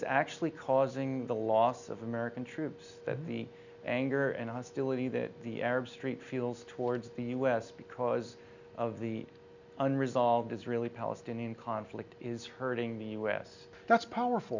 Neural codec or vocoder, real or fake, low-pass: none; real; 7.2 kHz